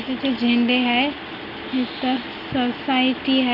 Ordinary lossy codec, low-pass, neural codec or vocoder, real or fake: none; 5.4 kHz; none; real